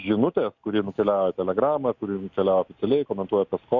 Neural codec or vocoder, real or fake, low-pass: none; real; 7.2 kHz